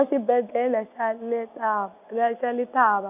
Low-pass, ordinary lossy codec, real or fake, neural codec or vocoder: 3.6 kHz; none; real; none